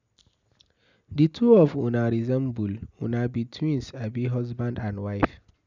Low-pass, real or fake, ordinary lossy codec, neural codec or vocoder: 7.2 kHz; real; none; none